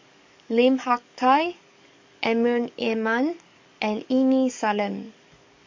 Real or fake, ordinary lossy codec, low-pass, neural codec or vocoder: fake; MP3, 48 kbps; 7.2 kHz; codec, 44.1 kHz, 7.8 kbps, DAC